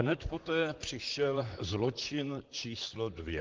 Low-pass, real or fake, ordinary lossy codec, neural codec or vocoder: 7.2 kHz; fake; Opus, 16 kbps; vocoder, 44.1 kHz, 128 mel bands, Pupu-Vocoder